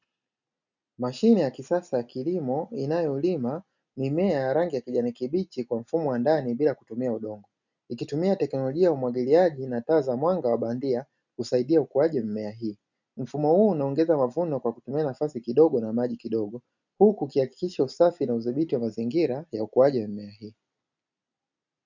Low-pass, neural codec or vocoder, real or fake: 7.2 kHz; none; real